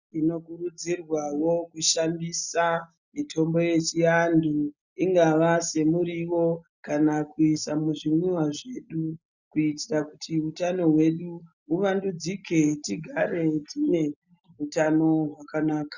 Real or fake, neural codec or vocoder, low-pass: real; none; 7.2 kHz